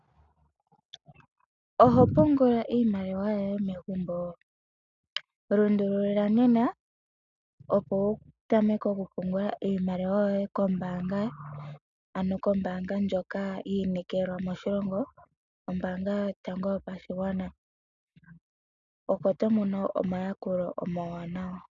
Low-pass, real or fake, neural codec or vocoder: 7.2 kHz; real; none